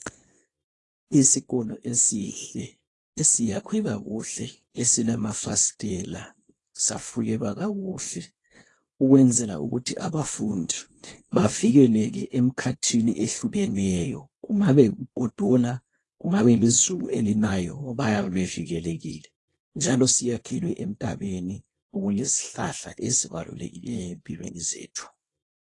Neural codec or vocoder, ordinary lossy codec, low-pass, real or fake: codec, 24 kHz, 0.9 kbps, WavTokenizer, small release; AAC, 32 kbps; 10.8 kHz; fake